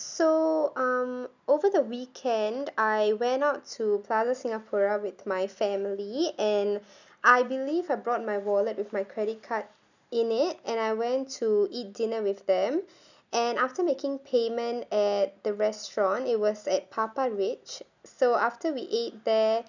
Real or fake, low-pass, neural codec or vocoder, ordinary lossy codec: real; 7.2 kHz; none; none